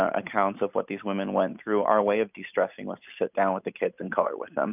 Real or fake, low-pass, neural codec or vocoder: real; 3.6 kHz; none